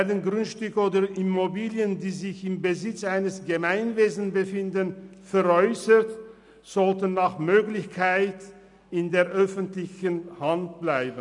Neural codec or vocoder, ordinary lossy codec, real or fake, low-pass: none; none; real; 10.8 kHz